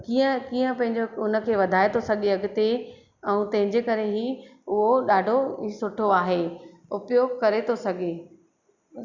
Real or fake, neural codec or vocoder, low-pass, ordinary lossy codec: real; none; 7.2 kHz; Opus, 64 kbps